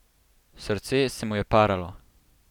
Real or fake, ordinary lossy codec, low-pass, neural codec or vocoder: real; none; 19.8 kHz; none